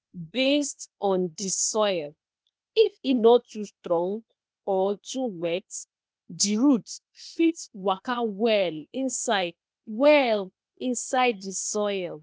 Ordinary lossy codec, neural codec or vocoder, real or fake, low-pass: none; codec, 16 kHz, 0.8 kbps, ZipCodec; fake; none